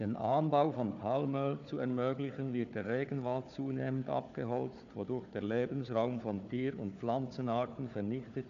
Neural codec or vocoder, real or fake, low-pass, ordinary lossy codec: codec, 16 kHz, 4 kbps, FunCodec, trained on Chinese and English, 50 frames a second; fake; 7.2 kHz; none